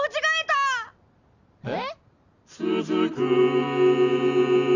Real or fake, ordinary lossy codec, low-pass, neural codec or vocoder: real; none; 7.2 kHz; none